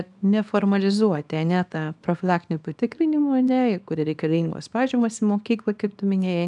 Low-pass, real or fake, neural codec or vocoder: 10.8 kHz; fake; codec, 24 kHz, 0.9 kbps, WavTokenizer, small release